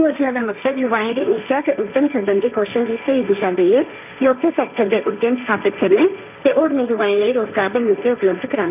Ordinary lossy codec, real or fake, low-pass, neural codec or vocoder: none; fake; 3.6 kHz; codec, 16 kHz, 1.1 kbps, Voila-Tokenizer